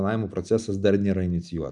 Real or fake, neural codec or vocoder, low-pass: real; none; 10.8 kHz